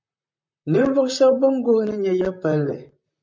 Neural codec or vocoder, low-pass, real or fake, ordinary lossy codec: vocoder, 44.1 kHz, 128 mel bands, Pupu-Vocoder; 7.2 kHz; fake; MP3, 48 kbps